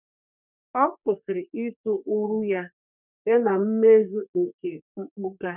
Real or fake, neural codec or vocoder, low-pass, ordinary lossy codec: fake; codec, 44.1 kHz, 3.4 kbps, Pupu-Codec; 3.6 kHz; none